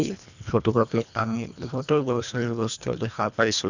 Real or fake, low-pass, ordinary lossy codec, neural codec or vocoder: fake; 7.2 kHz; none; codec, 24 kHz, 1.5 kbps, HILCodec